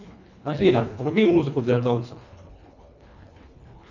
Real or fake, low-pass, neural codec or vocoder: fake; 7.2 kHz; codec, 24 kHz, 1.5 kbps, HILCodec